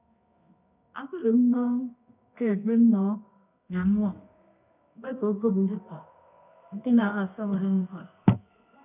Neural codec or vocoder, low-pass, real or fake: codec, 24 kHz, 0.9 kbps, WavTokenizer, medium music audio release; 3.6 kHz; fake